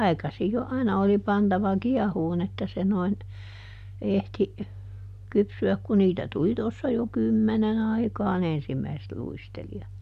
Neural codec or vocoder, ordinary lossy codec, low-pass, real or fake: none; none; 14.4 kHz; real